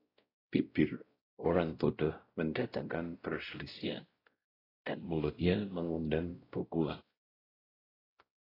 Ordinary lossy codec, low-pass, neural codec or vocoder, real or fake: AAC, 24 kbps; 5.4 kHz; codec, 16 kHz, 0.5 kbps, X-Codec, WavLM features, trained on Multilingual LibriSpeech; fake